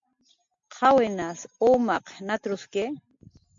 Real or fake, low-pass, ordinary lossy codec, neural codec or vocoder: real; 7.2 kHz; MP3, 64 kbps; none